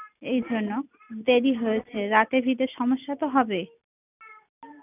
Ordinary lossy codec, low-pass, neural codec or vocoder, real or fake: none; 3.6 kHz; none; real